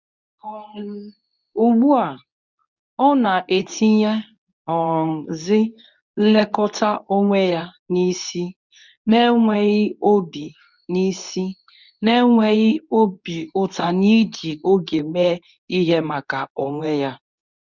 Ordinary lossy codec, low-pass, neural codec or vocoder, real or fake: none; 7.2 kHz; codec, 24 kHz, 0.9 kbps, WavTokenizer, medium speech release version 2; fake